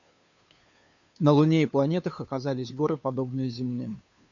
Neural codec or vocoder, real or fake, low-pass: codec, 16 kHz, 2 kbps, FunCodec, trained on Chinese and English, 25 frames a second; fake; 7.2 kHz